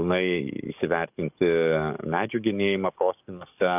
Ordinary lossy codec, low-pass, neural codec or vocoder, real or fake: AAC, 32 kbps; 3.6 kHz; codec, 44.1 kHz, 7.8 kbps, DAC; fake